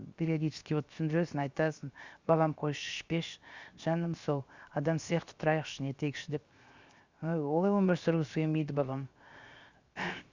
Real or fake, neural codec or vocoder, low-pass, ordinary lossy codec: fake; codec, 16 kHz, 0.7 kbps, FocalCodec; 7.2 kHz; Opus, 64 kbps